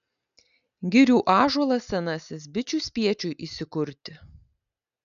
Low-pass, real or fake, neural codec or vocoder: 7.2 kHz; real; none